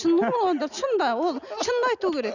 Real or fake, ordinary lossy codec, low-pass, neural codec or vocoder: real; none; 7.2 kHz; none